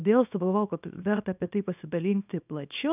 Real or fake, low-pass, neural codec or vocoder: fake; 3.6 kHz; codec, 24 kHz, 0.9 kbps, WavTokenizer, small release